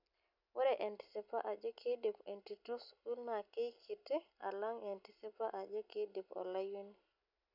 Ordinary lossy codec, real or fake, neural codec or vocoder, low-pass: none; real; none; 5.4 kHz